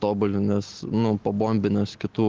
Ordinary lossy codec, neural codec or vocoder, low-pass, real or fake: Opus, 16 kbps; none; 7.2 kHz; real